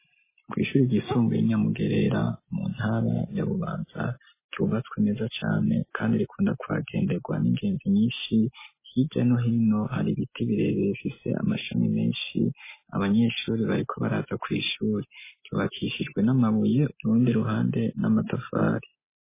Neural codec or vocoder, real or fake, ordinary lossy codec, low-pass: none; real; MP3, 16 kbps; 3.6 kHz